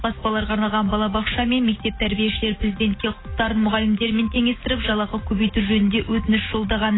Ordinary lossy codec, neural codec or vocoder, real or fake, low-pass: AAC, 16 kbps; none; real; 7.2 kHz